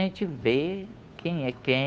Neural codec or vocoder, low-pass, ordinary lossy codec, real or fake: codec, 16 kHz, 2 kbps, FunCodec, trained on Chinese and English, 25 frames a second; none; none; fake